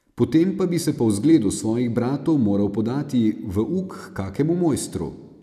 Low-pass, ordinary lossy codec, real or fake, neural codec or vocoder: 14.4 kHz; none; real; none